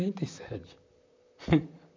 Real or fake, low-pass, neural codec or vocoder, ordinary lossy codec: fake; 7.2 kHz; vocoder, 44.1 kHz, 128 mel bands, Pupu-Vocoder; MP3, 64 kbps